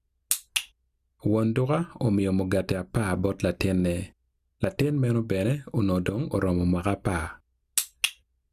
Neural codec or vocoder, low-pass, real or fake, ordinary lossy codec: none; 14.4 kHz; real; Opus, 64 kbps